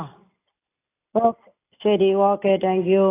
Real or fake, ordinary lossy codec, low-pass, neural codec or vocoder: real; AAC, 24 kbps; 3.6 kHz; none